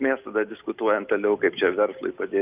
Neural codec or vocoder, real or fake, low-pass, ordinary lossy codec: none; real; 3.6 kHz; Opus, 24 kbps